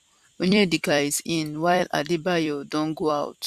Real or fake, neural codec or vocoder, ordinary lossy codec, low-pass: fake; vocoder, 44.1 kHz, 128 mel bands every 256 samples, BigVGAN v2; Opus, 64 kbps; 14.4 kHz